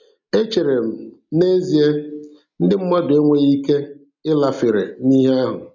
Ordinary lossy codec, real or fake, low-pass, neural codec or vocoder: none; real; 7.2 kHz; none